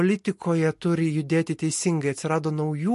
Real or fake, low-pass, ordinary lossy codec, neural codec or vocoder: real; 14.4 kHz; MP3, 48 kbps; none